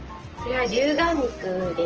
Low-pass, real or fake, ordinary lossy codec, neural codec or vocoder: 7.2 kHz; real; Opus, 16 kbps; none